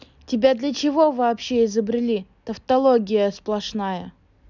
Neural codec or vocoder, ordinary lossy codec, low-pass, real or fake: none; none; 7.2 kHz; real